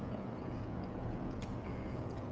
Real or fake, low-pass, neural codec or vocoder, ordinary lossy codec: fake; none; codec, 16 kHz, 16 kbps, FreqCodec, smaller model; none